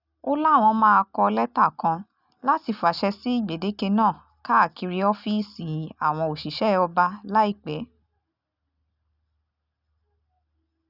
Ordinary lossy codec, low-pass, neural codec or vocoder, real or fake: none; 5.4 kHz; none; real